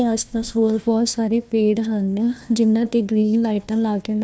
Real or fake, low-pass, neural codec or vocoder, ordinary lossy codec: fake; none; codec, 16 kHz, 1 kbps, FunCodec, trained on Chinese and English, 50 frames a second; none